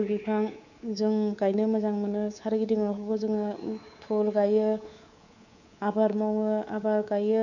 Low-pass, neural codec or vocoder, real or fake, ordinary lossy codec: 7.2 kHz; codec, 24 kHz, 3.1 kbps, DualCodec; fake; none